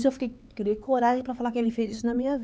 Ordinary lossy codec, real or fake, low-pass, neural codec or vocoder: none; fake; none; codec, 16 kHz, 4 kbps, X-Codec, HuBERT features, trained on LibriSpeech